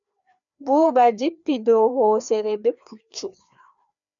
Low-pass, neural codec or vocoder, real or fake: 7.2 kHz; codec, 16 kHz, 2 kbps, FreqCodec, larger model; fake